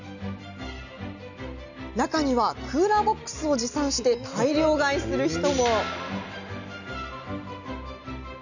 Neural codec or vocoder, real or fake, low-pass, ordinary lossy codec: none; real; 7.2 kHz; none